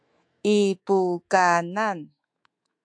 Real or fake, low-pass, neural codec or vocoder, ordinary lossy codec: fake; 9.9 kHz; autoencoder, 48 kHz, 128 numbers a frame, DAC-VAE, trained on Japanese speech; AAC, 64 kbps